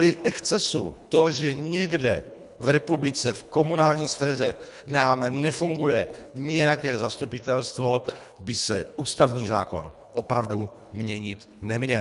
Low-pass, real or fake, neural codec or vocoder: 10.8 kHz; fake; codec, 24 kHz, 1.5 kbps, HILCodec